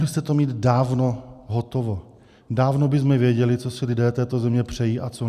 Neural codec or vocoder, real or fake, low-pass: none; real; 14.4 kHz